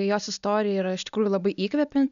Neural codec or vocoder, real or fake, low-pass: none; real; 7.2 kHz